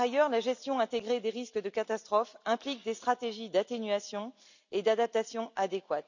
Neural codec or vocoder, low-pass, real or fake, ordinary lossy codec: none; 7.2 kHz; real; none